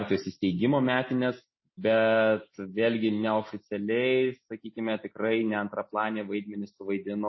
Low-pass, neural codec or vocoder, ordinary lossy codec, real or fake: 7.2 kHz; none; MP3, 24 kbps; real